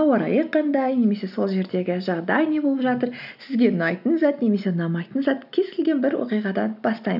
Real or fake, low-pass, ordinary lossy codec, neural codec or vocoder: real; 5.4 kHz; none; none